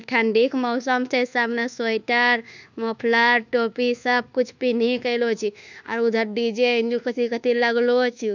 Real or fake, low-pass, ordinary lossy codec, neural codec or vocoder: fake; 7.2 kHz; none; codec, 24 kHz, 1.2 kbps, DualCodec